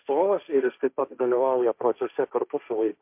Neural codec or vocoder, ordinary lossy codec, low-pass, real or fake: codec, 16 kHz, 1.1 kbps, Voila-Tokenizer; MP3, 32 kbps; 3.6 kHz; fake